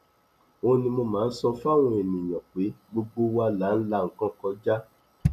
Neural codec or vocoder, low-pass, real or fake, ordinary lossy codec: none; 14.4 kHz; real; none